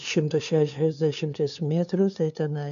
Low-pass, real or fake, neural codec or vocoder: 7.2 kHz; fake; codec, 16 kHz, 2 kbps, FunCodec, trained on LibriTTS, 25 frames a second